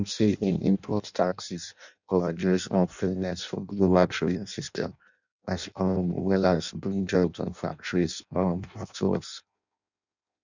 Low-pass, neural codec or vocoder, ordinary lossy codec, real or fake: 7.2 kHz; codec, 16 kHz in and 24 kHz out, 0.6 kbps, FireRedTTS-2 codec; none; fake